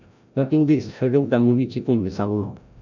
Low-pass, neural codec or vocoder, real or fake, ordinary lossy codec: 7.2 kHz; codec, 16 kHz, 0.5 kbps, FreqCodec, larger model; fake; none